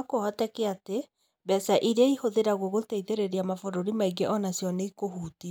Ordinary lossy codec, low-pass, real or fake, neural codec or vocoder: none; none; real; none